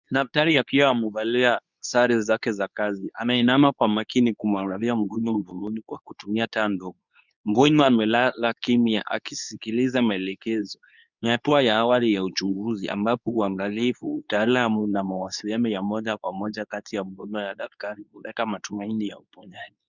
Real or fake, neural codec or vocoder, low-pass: fake; codec, 24 kHz, 0.9 kbps, WavTokenizer, medium speech release version 2; 7.2 kHz